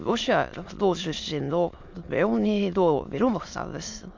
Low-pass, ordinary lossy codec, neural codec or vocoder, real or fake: 7.2 kHz; MP3, 64 kbps; autoencoder, 22.05 kHz, a latent of 192 numbers a frame, VITS, trained on many speakers; fake